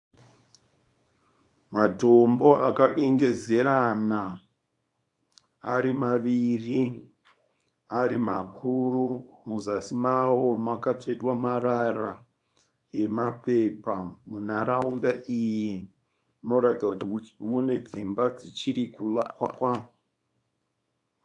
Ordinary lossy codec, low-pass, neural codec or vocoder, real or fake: AAC, 64 kbps; 10.8 kHz; codec, 24 kHz, 0.9 kbps, WavTokenizer, small release; fake